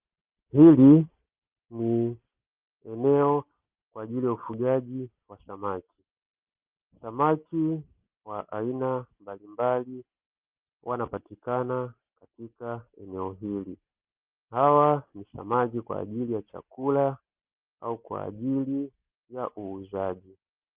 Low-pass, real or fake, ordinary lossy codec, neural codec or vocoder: 3.6 kHz; real; Opus, 32 kbps; none